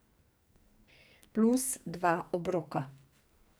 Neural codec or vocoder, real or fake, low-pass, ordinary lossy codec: codec, 44.1 kHz, 2.6 kbps, SNAC; fake; none; none